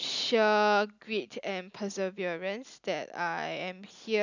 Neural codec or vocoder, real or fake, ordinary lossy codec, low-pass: none; real; none; 7.2 kHz